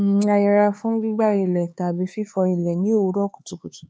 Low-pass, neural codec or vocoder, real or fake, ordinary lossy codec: none; codec, 16 kHz, 4 kbps, X-Codec, HuBERT features, trained on LibriSpeech; fake; none